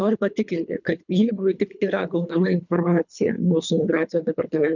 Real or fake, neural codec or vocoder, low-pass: fake; codec, 24 kHz, 3 kbps, HILCodec; 7.2 kHz